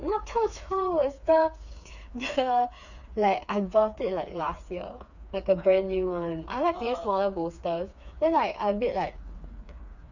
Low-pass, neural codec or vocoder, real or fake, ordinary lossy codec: 7.2 kHz; codec, 16 kHz, 4 kbps, FreqCodec, smaller model; fake; none